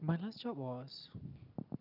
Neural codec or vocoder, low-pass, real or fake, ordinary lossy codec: none; 5.4 kHz; real; none